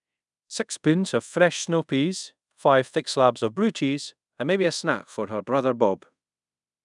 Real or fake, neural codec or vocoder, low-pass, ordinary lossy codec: fake; codec, 24 kHz, 0.5 kbps, DualCodec; 10.8 kHz; none